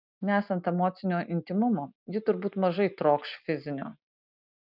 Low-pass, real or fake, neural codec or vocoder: 5.4 kHz; real; none